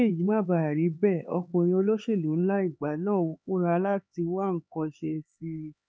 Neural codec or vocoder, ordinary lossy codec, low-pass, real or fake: codec, 16 kHz, 4 kbps, X-Codec, HuBERT features, trained on LibriSpeech; none; none; fake